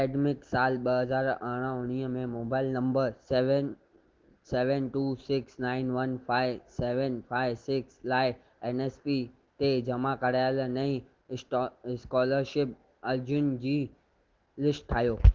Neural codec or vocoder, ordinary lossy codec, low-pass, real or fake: none; Opus, 16 kbps; 7.2 kHz; real